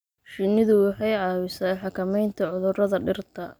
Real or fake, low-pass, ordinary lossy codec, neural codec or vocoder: real; none; none; none